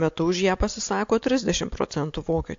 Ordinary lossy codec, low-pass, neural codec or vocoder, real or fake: AAC, 48 kbps; 7.2 kHz; none; real